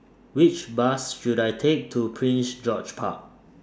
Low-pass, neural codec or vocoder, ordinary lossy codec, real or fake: none; none; none; real